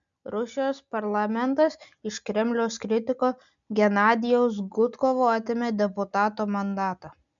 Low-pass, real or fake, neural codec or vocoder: 7.2 kHz; real; none